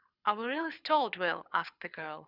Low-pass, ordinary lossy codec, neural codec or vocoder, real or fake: 5.4 kHz; Opus, 64 kbps; codec, 24 kHz, 6 kbps, HILCodec; fake